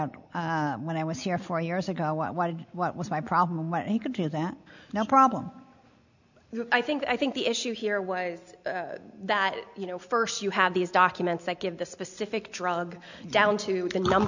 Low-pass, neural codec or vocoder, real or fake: 7.2 kHz; none; real